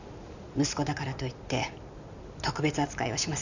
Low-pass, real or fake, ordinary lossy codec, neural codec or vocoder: 7.2 kHz; real; none; none